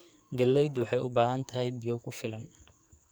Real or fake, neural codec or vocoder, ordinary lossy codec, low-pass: fake; codec, 44.1 kHz, 2.6 kbps, SNAC; none; none